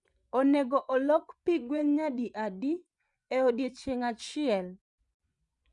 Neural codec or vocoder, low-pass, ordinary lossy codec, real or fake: vocoder, 24 kHz, 100 mel bands, Vocos; 10.8 kHz; none; fake